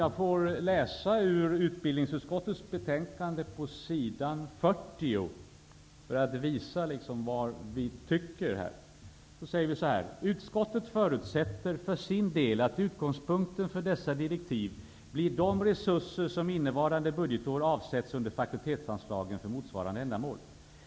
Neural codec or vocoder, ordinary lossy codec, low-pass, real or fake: none; none; none; real